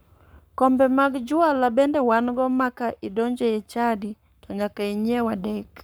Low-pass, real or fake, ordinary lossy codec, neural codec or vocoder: none; fake; none; codec, 44.1 kHz, 7.8 kbps, Pupu-Codec